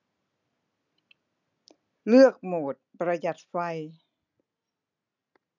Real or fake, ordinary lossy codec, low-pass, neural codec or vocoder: real; none; 7.2 kHz; none